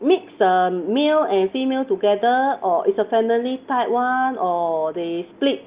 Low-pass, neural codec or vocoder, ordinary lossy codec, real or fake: 3.6 kHz; none; Opus, 32 kbps; real